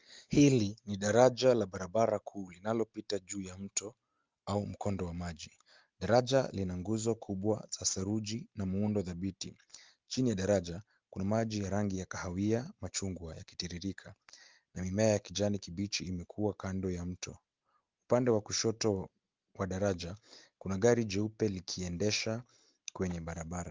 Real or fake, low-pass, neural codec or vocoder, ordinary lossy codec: real; 7.2 kHz; none; Opus, 32 kbps